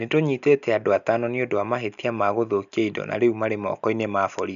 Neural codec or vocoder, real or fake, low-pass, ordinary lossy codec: none; real; 7.2 kHz; none